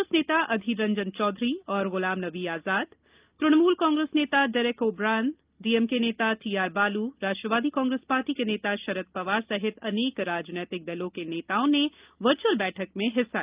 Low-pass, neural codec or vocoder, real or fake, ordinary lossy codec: 3.6 kHz; none; real; Opus, 24 kbps